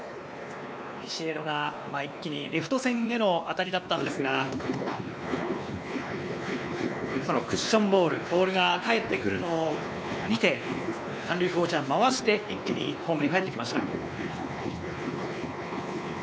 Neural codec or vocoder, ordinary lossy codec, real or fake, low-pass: codec, 16 kHz, 2 kbps, X-Codec, WavLM features, trained on Multilingual LibriSpeech; none; fake; none